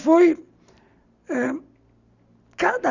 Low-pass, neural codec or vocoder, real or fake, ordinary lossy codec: 7.2 kHz; none; real; Opus, 64 kbps